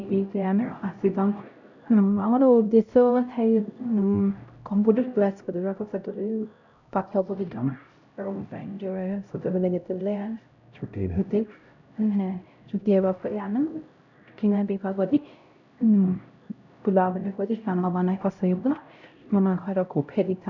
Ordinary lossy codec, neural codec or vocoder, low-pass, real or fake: none; codec, 16 kHz, 0.5 kbps, X-Codec, HuBERT features, trained on LibriSpeech; 7.2 kHz; fake